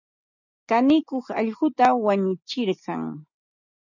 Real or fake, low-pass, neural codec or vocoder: real; 7.2 kHz; none